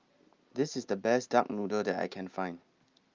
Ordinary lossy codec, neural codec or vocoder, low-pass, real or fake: Opus, 24 kbps; none; 7.2 kHz; real